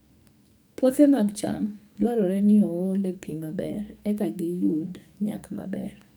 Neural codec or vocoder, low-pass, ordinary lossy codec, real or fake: codec, 44.1 kHz, 2.6 kbps, SNAC; none; none; fake